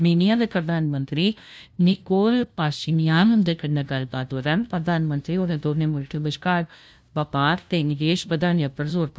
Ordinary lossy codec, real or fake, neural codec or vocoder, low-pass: none; fake; codec, 16 kHz, 0.5 kbps, FunCodec, trained on LibriTTS, 25 frames a second; none